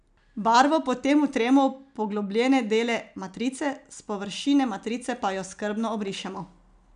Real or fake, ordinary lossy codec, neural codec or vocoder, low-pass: real; none; none; 9.9 kHz